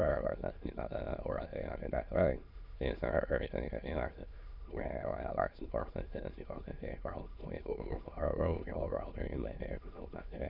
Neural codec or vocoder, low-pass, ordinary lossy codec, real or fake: autoencoder, 22.05 kHz, a latent of 192 numbers a frame, VITS, trained on many speakers; 5.4 kHz; Opus, 64 kbps; fake